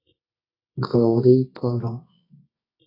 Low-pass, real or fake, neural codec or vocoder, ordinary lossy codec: 5.4 kHz; fake; codec, 24 kHz, 0.9 kbps, WavTokenizer, medium music audio release; AAC, 24 kbps